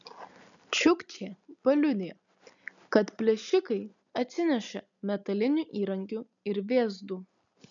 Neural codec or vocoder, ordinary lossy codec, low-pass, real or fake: none; MP3, 96 kbps; 7.2 kHz; real